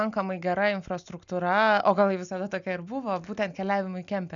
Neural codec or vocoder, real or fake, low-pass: none; real; 7.2 kHz